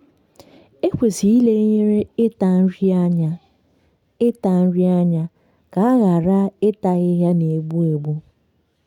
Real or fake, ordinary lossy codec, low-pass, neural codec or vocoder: real; none; 19.8 kHz; none